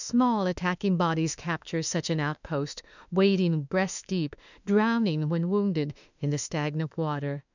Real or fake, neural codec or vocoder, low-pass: fake; autoencoder, 48 kHz, 32 numbers a frame, DAC-VAE, trained on Japanese speech; 7.2 kHz